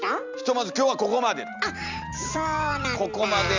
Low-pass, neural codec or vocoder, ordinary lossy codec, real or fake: 7.2 kHz; none; Opus, 64 kbps; real